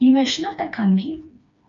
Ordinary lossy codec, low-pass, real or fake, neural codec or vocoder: AAC, 64 kbps; 7.2 kHz; fake; codec, 16 kHz, 2 kbps, FreqCodec, smaller model